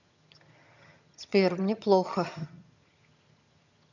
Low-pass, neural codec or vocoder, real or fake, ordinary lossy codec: 7.2 kHz; vocoder, 22.05 kHz, 80 mel bands, HiFi-GAN; fake; none